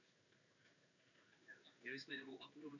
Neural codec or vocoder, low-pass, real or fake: codec, 16 kHz in and 24 kHz out, 1 kbps, XY-Tokenizer; 7.2 kHz; fake